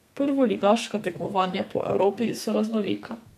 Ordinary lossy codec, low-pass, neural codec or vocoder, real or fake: none; 14.4 kHz; codec, 32 kHz, 1.9 kbps, SNAC; fake